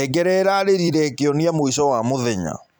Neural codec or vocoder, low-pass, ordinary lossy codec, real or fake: vocoder, 44.1 kHz, 128 mel bands every 256 samples, BigVGAN v2; 19.8 kHz; none; fake